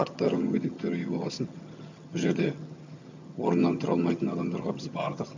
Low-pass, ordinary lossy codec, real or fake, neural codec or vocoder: 7.2 kHz; MP3, 64 kbps; fake; vocoder, 22.05 kHz, 80 mel bands, HiFi-GAN